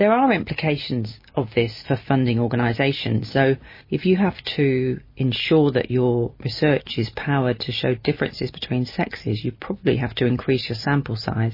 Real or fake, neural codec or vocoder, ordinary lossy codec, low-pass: real; none; MP3, 24 kbps; 5.4 kHz